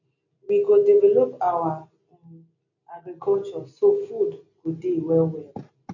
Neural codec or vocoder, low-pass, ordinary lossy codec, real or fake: none; 7.2 kHz; none; real